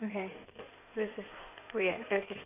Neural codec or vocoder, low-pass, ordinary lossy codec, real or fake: codec, 16 kHz in and 24 kHz out, 2.2 kbps, FireRedTTS-2 codec; 3.6 kHz; none; fake